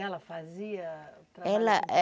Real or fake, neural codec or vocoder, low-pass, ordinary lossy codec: real; none; none; none